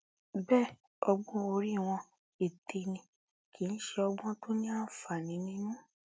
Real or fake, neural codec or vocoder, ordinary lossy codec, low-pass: real; none; none; none